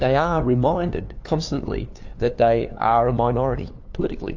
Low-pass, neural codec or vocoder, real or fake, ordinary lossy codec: 7.2 kHz; codec, 16 kHz, 2 kbps, FunCodec, trained on LibriTTS, 25 frames a second; fake; AAC, 48 kbps